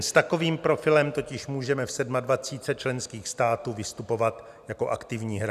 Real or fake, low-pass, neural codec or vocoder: real; 14.4 kHz; none